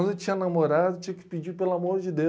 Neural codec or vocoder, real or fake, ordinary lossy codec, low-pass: none; real; none; none